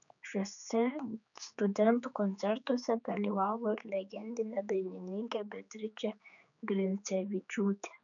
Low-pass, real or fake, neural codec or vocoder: 7.2 kHz; fake; codec, 16 kHz, 4 kbps, X-Codec, HuBERT features, trained on general audio